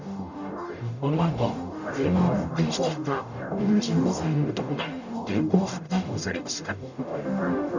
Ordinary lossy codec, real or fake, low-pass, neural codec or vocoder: none; fake; 7.2 kHz; codec, 44.1 kHz, 0.9 kbps, DAC